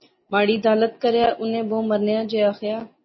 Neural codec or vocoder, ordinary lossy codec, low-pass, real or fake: none; MP3, 24 kbps; 7.2 kHz; real